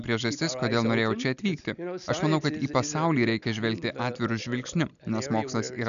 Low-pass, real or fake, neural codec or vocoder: 7.2 kHz; real; none